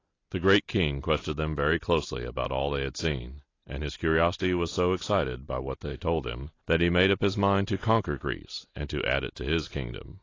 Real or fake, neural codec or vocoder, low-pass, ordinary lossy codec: real; none; 7.2 kHz; AAC, 32 kbps